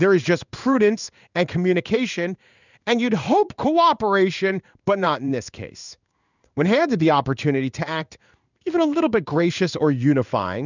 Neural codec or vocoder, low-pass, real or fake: codec, 16 kHz in and 24 kHz out, 1 kbps, XY-Tokenizer; 7.2 kHz; fake